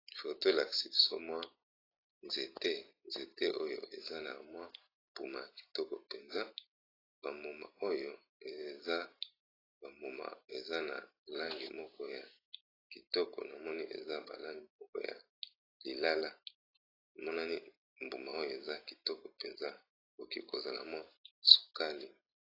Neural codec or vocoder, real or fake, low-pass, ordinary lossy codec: none; real; 5.4 kHz; AAC, 24 kbps